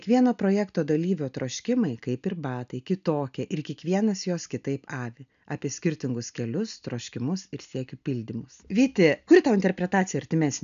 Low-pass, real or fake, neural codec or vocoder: 7.2 kHz; real; none